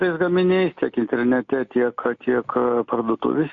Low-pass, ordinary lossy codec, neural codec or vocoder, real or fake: 7.2 kHz; AAC, 64 kbps; none; real